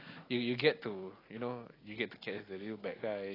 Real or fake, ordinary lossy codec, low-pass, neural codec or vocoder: real; AAC, 24 kbps; 5.4 kHz; none